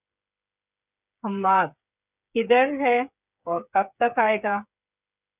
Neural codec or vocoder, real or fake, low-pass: codec, 16 kHz, 8 kbps, FreqCodec, smaller model; fake; 3.6 kHz